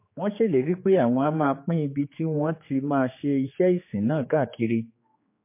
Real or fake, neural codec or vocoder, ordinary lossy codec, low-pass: fake; codec, 16 kHz, 4 kbps, X-Codec, HuBERT features, trained on general audio; MP3, 24 kbps; 3.6 kHz